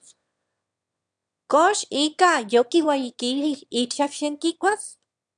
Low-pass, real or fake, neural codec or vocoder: 9.9 kHz; fake; autoencoder, 22.05 kHz, a latent of 192 numbers a frame, VITS, trained on one speaker